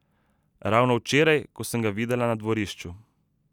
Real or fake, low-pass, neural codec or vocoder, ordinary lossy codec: real; 19.8 kHz; none; none